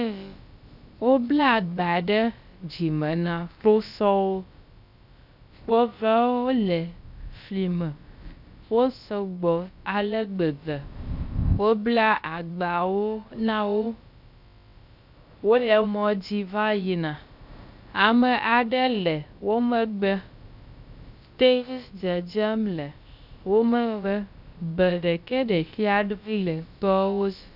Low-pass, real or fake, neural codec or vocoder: 5.4 kHz; fake; codec, 16 kHz, about 1 kbps, DyCAST, with the encoder's durations